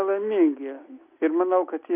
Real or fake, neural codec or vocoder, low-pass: real; none; 3.6 kHz